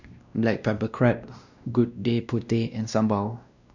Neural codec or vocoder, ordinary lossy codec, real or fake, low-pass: codec, 16 kHz, 1 kbps, X-Codec, WavLM features, trained on Multilingual LibriSpeech; none; fake; 7.2 kHz